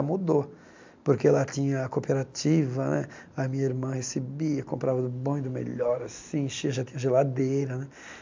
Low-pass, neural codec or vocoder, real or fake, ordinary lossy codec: 7.2 kHz; none; real; MP3, 64 kbps